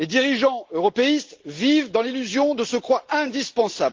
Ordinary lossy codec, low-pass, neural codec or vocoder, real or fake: Opus, 16 kbps; 7.2 kHz; none; real